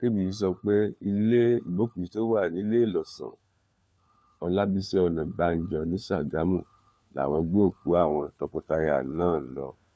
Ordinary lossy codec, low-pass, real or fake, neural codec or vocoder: none; none; fake; codec, 16 kHz, 4 kbps, FunCodec, trained on LibriTTS, 50 frames a second